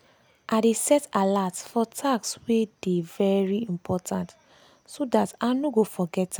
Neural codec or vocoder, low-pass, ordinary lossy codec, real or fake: none; none; none; real